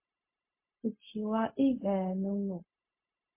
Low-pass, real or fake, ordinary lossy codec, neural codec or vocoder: 3.6 kHz; fake; MP3, 24 kbps; codec, 16 kHz, 0.4 kbps, LongCat-Audio-Codec